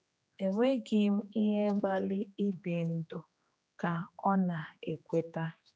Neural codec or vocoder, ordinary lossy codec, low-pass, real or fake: codec, 16 kHz, 2 kbps, X-Codec, HuBERT features, trained on general audio; none; none; fake